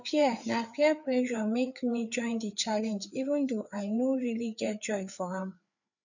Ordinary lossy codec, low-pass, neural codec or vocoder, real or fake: none; 7.2 kHz; codec, 16 kHz, 4 kbps, FreqCodec, larger model; fake